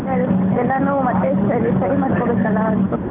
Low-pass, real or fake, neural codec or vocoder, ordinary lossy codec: 3.6 kHz; real; none; none